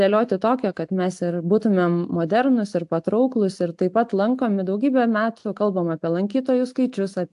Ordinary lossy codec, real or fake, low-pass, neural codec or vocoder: AAC, 64 kbps; real; 10.8 kHz; none